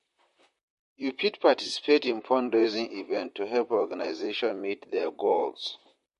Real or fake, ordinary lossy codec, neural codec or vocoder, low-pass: fake; MP3, 48 kbps; vocoder, 44.1 kHz, 128 mel bands, Pupu-Vocoder; 14.4 kHz